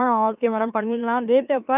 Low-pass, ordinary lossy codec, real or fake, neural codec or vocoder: 3.6 kHz; none; fake; autoencoder, 44.1 kHz, a latent of 192 numbers a frame, MeloTTS